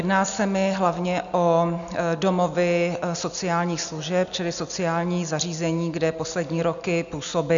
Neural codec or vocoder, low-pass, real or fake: none; 7.2 kHz; real